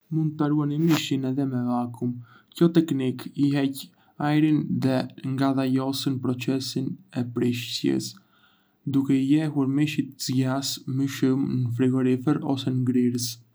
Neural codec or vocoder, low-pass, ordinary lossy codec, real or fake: none; none; none; real